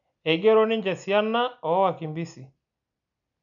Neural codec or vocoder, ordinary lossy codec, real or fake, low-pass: none; none; real; 7.2 kHz